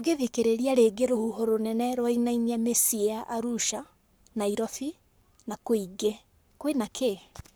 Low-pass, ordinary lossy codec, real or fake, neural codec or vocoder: none; none; fake; codec, 44.1 kHz, 7.8 kbps, Pupu-Codec